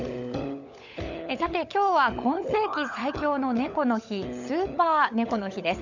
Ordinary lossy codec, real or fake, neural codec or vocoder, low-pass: none; fake; codec, 16 kHz, 16 kbps, FunCodec, trained on LibriTTS, 50 frames a second; 7.2 kHz